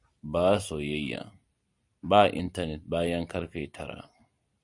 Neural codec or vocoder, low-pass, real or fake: none; 10.8 kHz; real